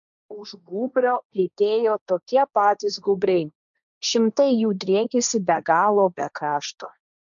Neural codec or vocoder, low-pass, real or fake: codec, 16 kHz, 1.1 kbps, Voila-Tokenizer; 7.2 kHz; fake